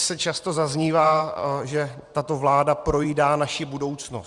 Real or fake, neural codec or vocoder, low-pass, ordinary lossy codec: fake; vocoder, 44.1 kHz, 128 mel bands every 512 samples, BigVGAN v2; 10.8 kHz; Opus, 64 kbps